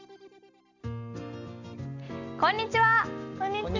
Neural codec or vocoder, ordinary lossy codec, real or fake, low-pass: none; none; real; 7.2 kHz